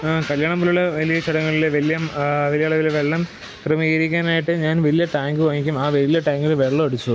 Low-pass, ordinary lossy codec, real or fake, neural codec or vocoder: none; none; real; none